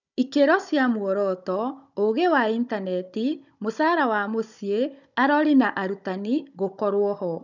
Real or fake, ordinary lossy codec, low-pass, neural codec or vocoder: fake; none; 7.2 kHz; codec, 16 kHz, 16 kbps, FunCodec, trained on Chinese and English, 50 frames a second